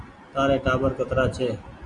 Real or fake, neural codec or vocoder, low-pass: real; none; 10.8 kHz